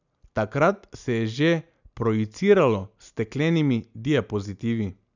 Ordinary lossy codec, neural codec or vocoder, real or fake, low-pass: none; none; real; 7.2 kHz